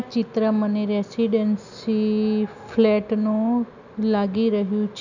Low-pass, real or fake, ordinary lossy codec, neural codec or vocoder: 7.2 kHz; real; none; none